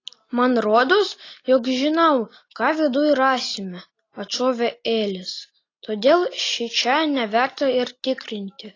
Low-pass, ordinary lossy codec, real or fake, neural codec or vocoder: 7.2 kHz; AAC, 32 kbps; real; none